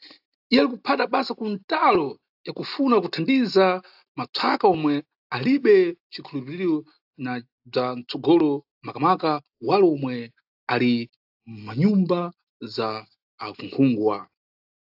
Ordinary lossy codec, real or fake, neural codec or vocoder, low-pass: AAC, 48 kbps; real; none; 5.4 kHz